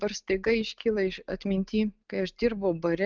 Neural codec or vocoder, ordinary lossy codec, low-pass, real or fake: none; Opus, 24 kbps; 7.2 kHz; real